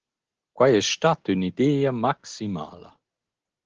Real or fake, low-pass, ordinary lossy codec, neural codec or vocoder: real; 7.2 kHz; Opus, 16 kbps; none